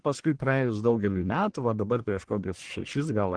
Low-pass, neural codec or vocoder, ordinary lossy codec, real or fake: 9.9 kHz; codec, 44.1 kHz, 1.7 kbps, Pupu-Codec; Opus, 16 kbps; fake